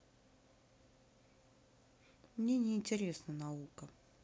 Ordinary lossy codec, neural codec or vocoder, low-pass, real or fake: none; none; none; real